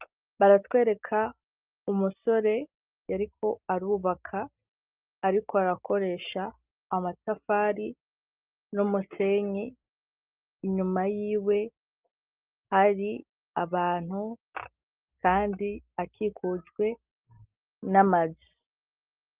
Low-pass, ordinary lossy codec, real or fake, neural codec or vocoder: 3.6 kHz; Opus, 24 kbps; real; none